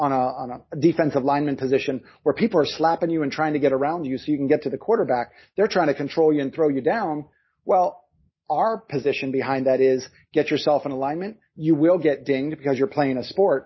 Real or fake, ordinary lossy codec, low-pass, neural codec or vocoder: real; MP3, 24 kbps; 7.2 kHz; none